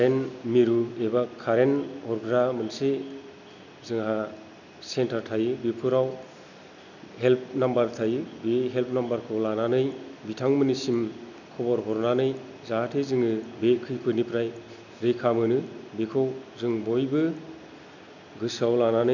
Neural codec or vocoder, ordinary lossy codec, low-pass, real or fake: none; none; 7.2 kHz; real